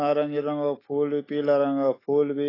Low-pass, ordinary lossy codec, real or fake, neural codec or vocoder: 5.4 kHz; AAC, 24 kbps; fake; autoencoder, 48 kHz, 128 numbers a frame, DAC-VAE, trained on Japanese speech